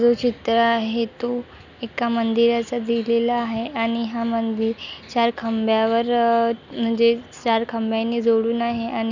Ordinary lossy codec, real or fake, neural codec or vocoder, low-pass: none; real; none; 7.2 kHz